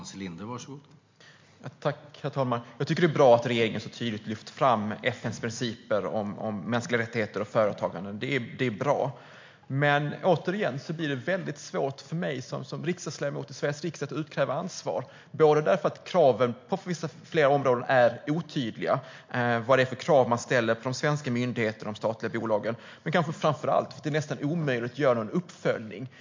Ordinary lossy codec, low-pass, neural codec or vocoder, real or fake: MP3, 48 kbps; 7.2 kHz; none; real